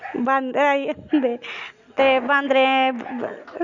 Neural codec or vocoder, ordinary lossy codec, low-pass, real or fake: none; none; 7.2 kHz; real